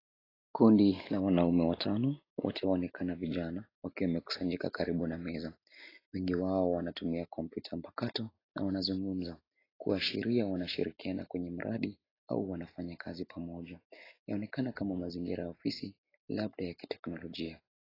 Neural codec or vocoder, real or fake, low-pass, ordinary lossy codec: none; real; 5.4 kHz; AAC, 24 kbps